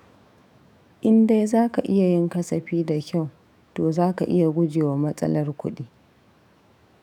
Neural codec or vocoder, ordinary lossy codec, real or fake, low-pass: autoencoder, 48 kHz, 128 numbers a frame, DAC-VAE, trained on Japanese speech; none; fake; 19.8 kHz